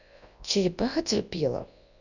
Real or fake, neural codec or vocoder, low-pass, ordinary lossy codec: fake; codec, 24 kHz, 0.9 kbps, WavTokenizer, large speech release; 7.2 kHz; none